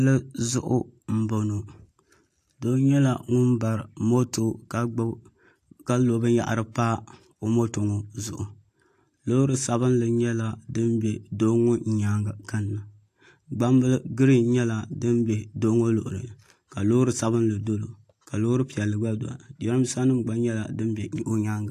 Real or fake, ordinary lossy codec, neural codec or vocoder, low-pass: real; AAC, 64 kbps; none; 14.4 kHz